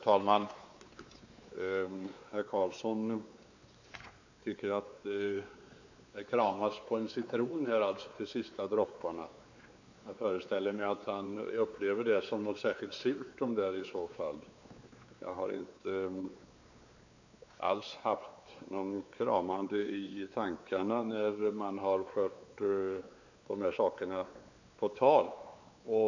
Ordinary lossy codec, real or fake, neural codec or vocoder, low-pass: AAC, 48 kbps; fake; codec, 16 kHz, 4 kbps, X-Codec, WavLM features, trained on Multilingual LibriSpeech; 7.2 kHz